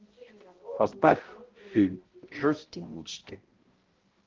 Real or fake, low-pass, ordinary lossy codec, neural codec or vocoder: fake; 7.2 kHz; Opus, 16 kbps; codec, 16 kHz, 0.5 kbps, X-Codec, HuBERT features, trained on balanced general audio